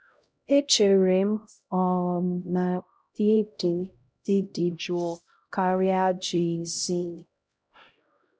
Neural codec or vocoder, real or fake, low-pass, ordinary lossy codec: codec, 16 kHz, 0.5 kbps, X-Codec, HuBERT features, trained on LibriSpeech; fake; none; none